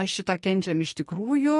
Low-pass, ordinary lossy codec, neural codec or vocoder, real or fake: 14.4 kHz; MP3, 48 kbps; codec, 44.1 kHz, 2.6 kbps, SNAC; fake